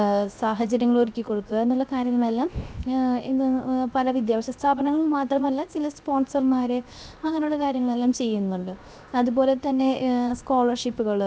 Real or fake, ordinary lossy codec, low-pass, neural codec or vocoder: fake; none; none; codec, 16 kHz, 0.7 kbps, FocalCodec